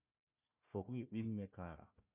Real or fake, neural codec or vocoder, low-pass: fake; codec, 16 kHz, 1 kbps, FunCodec, trained on Chinese and English, 50 frames a second; 3.6 kHz